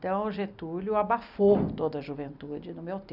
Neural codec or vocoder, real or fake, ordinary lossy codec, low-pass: none; real; none; 5.4 kHz